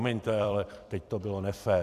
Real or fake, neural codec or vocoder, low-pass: fake; vocoder, 44.1 kHz, 128 mel bands every 256 samples, BigVGAN v2; 14.4 kHz